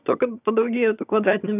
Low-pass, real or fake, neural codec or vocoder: 3.6 kHz; fake; vocoder, 22.05 kHz, 80 mel bands, HiFi-GAN